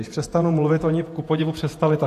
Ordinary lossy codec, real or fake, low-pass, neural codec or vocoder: AAC, 64 kbps; fake; 14.4 kHz; vocoder, 48 kHz, 128 mel bands, Vocos